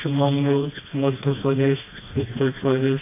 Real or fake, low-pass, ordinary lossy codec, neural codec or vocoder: fake; 3.6 kHz; AAC, 24 kbps; codec, 16 kHz, 1 kbps, FreqCodec, smaller model